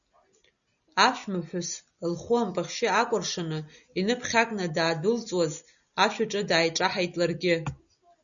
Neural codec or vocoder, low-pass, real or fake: none; 7.2 kHz; real